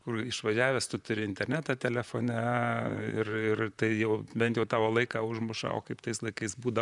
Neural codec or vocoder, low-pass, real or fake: vocoder, 44.1 kHz, 128 mel bands, Pupu-Vocoder; 10.8 kHz; fake